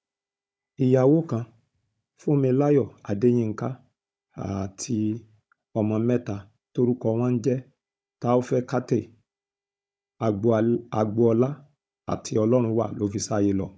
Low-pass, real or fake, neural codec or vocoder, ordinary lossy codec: none; fake; codec, 16 kHz, 16 kbps, FunCodec, trained on Chinese and English, 50 frames a second; none